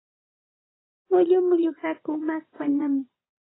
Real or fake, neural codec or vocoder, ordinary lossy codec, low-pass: fake; vocoder, 44.1 kHz, 80 mel bands, Vocos; AAC, 16 kbps; 7.2 kHz